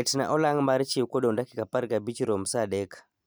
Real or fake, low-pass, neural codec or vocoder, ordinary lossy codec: real; none; none; none